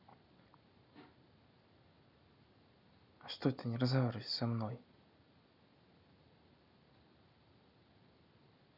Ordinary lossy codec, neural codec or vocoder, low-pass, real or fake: none; none; 5.4 kHz; real